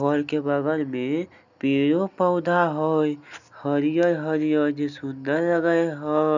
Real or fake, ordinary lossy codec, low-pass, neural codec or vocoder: real; none; 7.2 kHz; none